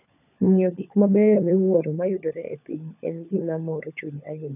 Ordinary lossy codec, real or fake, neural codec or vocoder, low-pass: none; fake; codec, 16 kHz, 16 kbps, FunCodec, trained on LibriTTS, 50 frames a second; 3.6 kHz